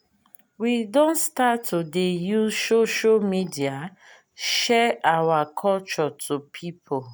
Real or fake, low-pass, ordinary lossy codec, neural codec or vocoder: real; none; none; none